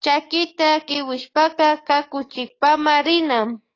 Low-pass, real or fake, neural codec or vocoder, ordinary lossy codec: 7.2 kHz; fake; codec, 44.1 kHz, 7.8 kbps, DAC; AAC, 32 kbps